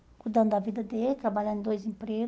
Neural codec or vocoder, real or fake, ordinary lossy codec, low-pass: none; real; none; none